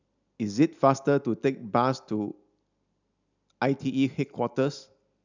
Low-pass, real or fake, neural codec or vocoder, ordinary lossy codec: 7.2 kHz; real; none; none